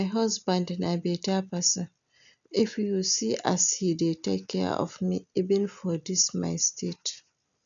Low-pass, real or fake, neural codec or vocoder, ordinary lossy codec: 7.2 kHz; real; none; none